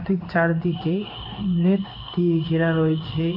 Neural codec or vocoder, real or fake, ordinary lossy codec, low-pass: codec, 16 kHz in and 24 kHz out, 1 kbps, XY-Tokenizer; fake; AAC, 48 kbps; 5.4 kHz